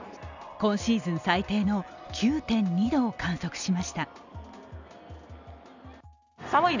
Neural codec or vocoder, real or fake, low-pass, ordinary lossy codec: none; real; 7.2 kHz; none